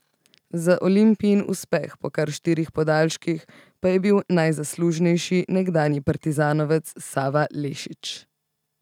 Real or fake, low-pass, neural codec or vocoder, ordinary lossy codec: real; 19.8 kHz; none; none